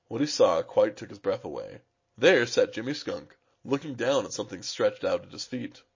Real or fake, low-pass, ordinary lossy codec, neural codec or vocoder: real; 7.2 kHz; MP3, 32 kbps; none